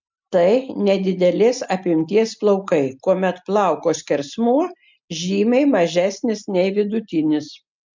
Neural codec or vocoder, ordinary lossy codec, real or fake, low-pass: none; MP3, 64 kbps; real; 7.2 kHz